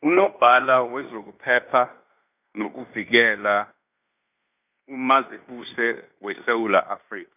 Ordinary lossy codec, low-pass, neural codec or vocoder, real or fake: none; 3.6 kHz; codec, 16 kHz in and 24 kHz out, 0.9 kbps, LongCat-Audio-Codec, fine tuned four codebook decoder; fake